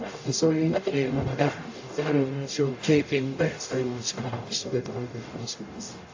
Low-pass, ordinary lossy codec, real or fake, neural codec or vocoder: 7.2 kHz; AAC, 48 kbps; fake; codec, 44.1 kHz, 0.9 kbps, DAC